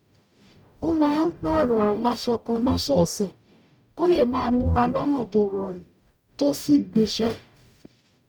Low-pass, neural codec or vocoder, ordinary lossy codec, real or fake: 19.8 kHz; codec, 44.1 kHz, 0.9 kbps, DAC; none; fake